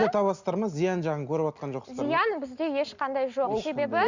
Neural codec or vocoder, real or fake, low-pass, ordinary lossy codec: none; real; 7.2 kHz; Opus, 64 kbps